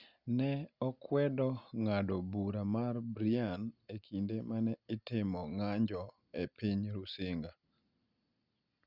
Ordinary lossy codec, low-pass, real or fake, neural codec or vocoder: Opus, 64 kbps; 5.4 kHz; real; none